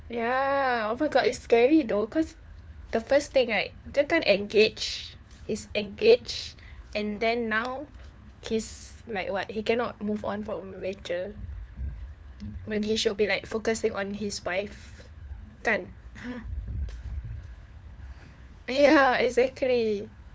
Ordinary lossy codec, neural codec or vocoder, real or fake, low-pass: none; codec, 16 kHz, 4 kbps, FunCodec, trained on LibriTTS, 50 frames a second; fake; none